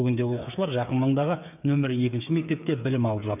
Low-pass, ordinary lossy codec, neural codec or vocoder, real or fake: 3.6 kHz; none; codec, 16 kHz, 8 kbps, FreqCodec, smaller model; fake